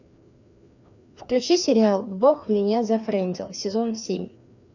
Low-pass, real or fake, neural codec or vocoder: 7.2 kHz; fake; codec, 16 kHz, 2 kbps, FreqCodec, larger model